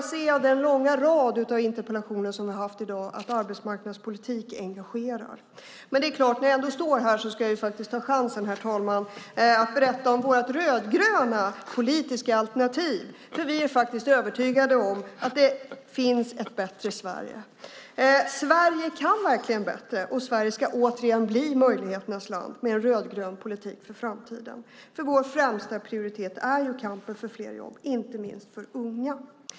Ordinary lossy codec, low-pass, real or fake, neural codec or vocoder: none; none; real; none